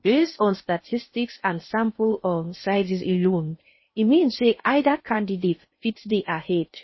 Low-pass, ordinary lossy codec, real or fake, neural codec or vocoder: 7.2 kHz; MP3, 24 kbps; fake; codec, 16 kHz in and 24 kHz out, 0.6 kbps, FocalCodec, streaming, 2048 codes